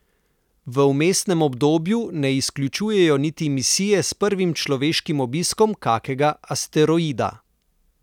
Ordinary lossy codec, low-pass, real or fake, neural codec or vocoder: none; 19.8 kHz; real; none